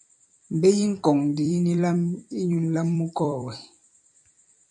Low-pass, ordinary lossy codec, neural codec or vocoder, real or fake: 10.8 kHz; MP3, 96 kbps; vocoder, 44.1 kHz, 128 mel bands every 256 samples, BigVGAN v2; fake